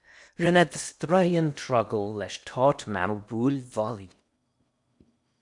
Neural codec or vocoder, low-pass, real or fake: codec, 16 kHz in and 24 kHz out, 0.8 kbps, FocalCodec, streaming, 65536 codes; 10.8 kHz; fake